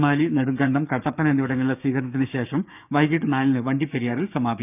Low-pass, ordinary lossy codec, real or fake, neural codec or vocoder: 3.6 kHz; none; fake; codec, 16 kHz, 6 kbps, DAC